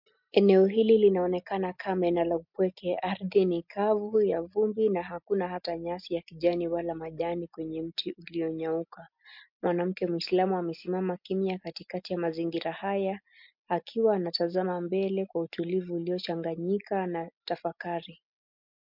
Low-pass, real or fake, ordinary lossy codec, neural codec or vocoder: 5.4 kHz; real; MP3, 48 kbps; none